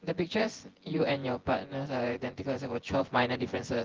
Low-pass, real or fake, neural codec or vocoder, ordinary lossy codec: 7.2 kHz; fake; vocoder, 24 kHz, 100 mel bands, Vocos; Opus, 16 kbps